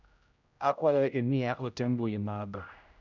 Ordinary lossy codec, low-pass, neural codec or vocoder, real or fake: none; 7.2 kHz; codec, 16 kHz, 0.5 kbps, X-Codec, HuBERT features, trained on general audio; fake